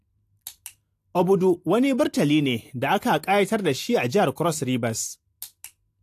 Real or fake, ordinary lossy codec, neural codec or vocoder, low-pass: real; AAC, 64 kbps; none; 14.4 kHz